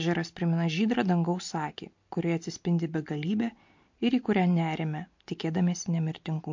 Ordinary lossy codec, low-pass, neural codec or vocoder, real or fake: MP3, 48 kbps; 7.2 kHz; none; real